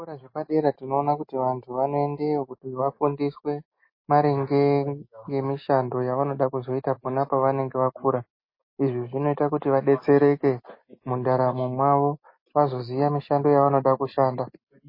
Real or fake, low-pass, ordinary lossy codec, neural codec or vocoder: real; 5.4 kHz; MP3, 24 kbps; none